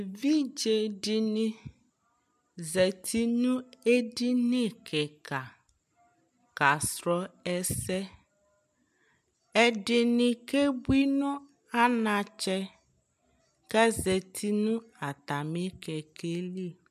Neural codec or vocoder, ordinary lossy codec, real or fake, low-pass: none; MP3, 96 kbps; real; 14.4 kHz